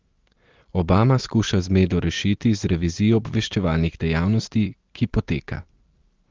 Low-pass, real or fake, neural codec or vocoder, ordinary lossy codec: 7.2 kHz; real; none; Opus, 16 kbps